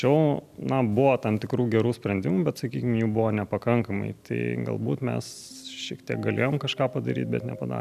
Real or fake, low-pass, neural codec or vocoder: real; 14.4 kHz; none